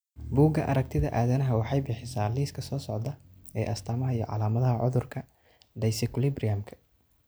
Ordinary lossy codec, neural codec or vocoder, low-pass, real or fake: none; none; none; real